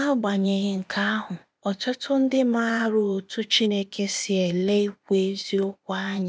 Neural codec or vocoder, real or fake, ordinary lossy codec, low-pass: codec, 16 kHz, 0.8 kbps, ZipCodec; fake; none; none